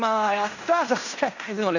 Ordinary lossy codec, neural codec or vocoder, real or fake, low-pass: none; codec, 16 kHz in and 24 kHz out, 0.9 kbps, LongCat-Audio-Codec, fine tuned four codebook decoder; fake; 7.2 kHz